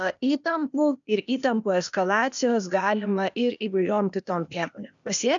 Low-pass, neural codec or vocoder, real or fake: 7.2 kHz; codec, 16 kHz, 0.8 kbps, ZipCodec; fake